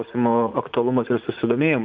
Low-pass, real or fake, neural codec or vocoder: 7.2 kHz; fake; vocoder, 44.1 kHz, 80 mel bands, Vocos